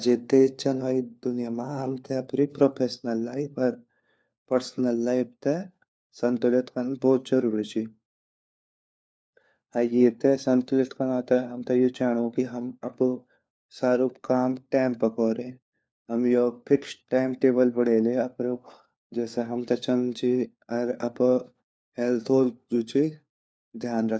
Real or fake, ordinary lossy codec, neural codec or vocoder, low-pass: fake; none; codec, 16 kHz, 4 kbps, FunCodec, trained on LibriTTS, 50 frames a second; none